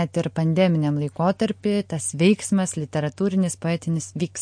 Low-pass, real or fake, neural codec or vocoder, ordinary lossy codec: 9.9 kHz; real; none; MP3, 48 kbps